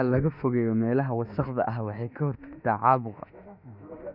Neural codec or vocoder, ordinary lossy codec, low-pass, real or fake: autoencoder, 48 kHz, 32 numbers a frame, DAC-VAE, trained on Japanese speech; Opus, 64 kbps; 5.4 kHz; fake